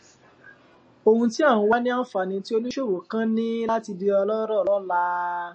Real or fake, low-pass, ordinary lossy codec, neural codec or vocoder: real; 9.9 kHz; MP3, 32 kbps; none